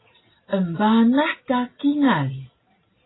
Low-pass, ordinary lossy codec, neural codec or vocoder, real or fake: 7.2 kHz; AAC, 16 kbps; none; real